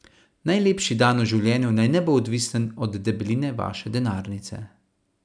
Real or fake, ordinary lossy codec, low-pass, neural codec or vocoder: real; none; 9.9 kHz; none